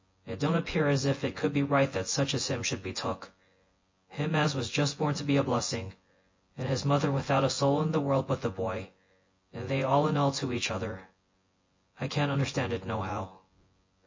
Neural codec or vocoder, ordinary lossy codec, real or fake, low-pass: vocoder, 24 kHz, 100 mel bands, Vocos; MP3, 32 kbps; fake; 7.2 kHz